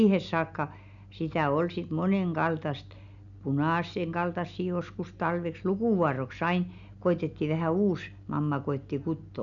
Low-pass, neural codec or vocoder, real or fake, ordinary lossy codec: 7.2 kHz; none; real; none